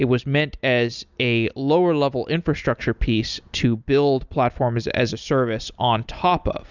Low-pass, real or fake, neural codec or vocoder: 7.2 kHz; real; none